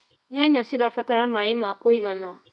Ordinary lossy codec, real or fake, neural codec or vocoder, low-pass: none; fake; codec, 24 kHz, 0.9 kbps, WavTokenizer, medium music audio release; 10.8 kHz